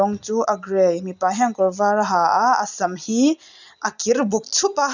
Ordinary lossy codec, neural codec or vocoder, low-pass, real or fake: none; none; 7.2 kHz; real